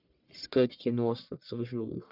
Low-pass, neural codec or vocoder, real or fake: 5.4 kHz; codec, 44.1 kHz, 1.7 kbps, Pupu-Codec; fake